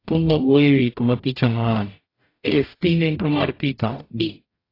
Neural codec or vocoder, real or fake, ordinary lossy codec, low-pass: codec, 44.1 kHz, 0.9 kbps, DAC; fake; AAC, 32 kbps; 5.4 kHz